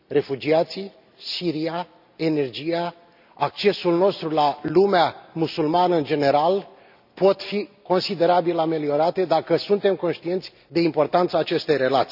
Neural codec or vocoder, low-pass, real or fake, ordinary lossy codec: none; 5.4 kHz; real; none